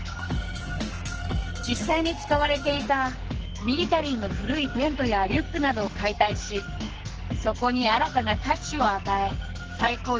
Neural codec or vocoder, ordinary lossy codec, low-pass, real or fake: codec, 44.1 kHz, 2.6 kbps, SNAC; Opus, 16 kbps; 7.2 kHz; fake